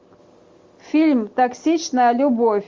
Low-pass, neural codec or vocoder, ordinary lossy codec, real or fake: 7.2 kHz; none; Opus, 32 kbps; real